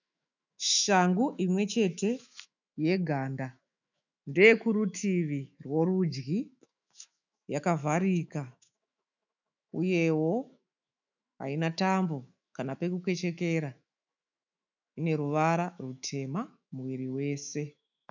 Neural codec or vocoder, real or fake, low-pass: autoencoder, 48 kHz, 128 numbers a frame, DAC-VAE, trained on Japanese speech; fake; 7.2 kHz